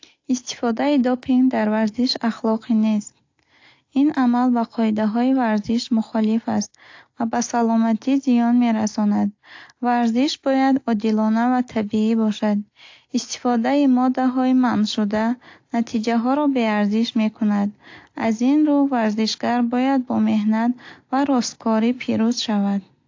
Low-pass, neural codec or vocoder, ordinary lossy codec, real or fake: 7.2 kHz; none; AAC, 48 kbps; real